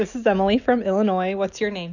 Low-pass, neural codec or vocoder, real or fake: 7.2 kHz; none; real